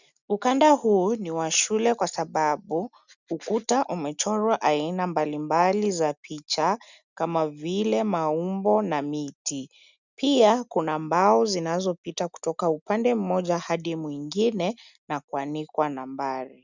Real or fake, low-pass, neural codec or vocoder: real; 7.2 kHz; none